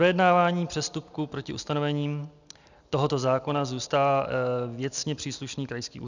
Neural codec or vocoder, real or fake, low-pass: none; real; 7.2 kHz